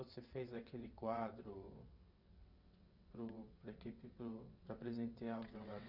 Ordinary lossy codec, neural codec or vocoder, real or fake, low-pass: none; vocoder, 22.05 kHz, 80 mel bands, WaveNeXt; fake; 5.4 kHz